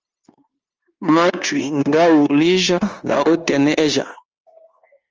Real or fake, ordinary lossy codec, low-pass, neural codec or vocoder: fake; Opus, 24 kbps; 7.2 kHz; codec, 16 kHz, 0.9 kbps, LongCat-Audio-Codec